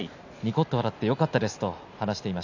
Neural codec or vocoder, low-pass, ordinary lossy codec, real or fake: none; 7.2 kHz; AAC, 48 kbps; real